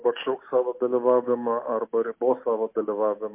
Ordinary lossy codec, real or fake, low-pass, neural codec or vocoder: MP3, 24 kbps; real; 3.6 kHz; none